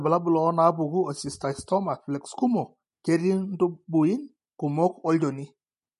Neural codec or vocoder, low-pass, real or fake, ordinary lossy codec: none; 14.4 kHz; real; MP3, 48 kbps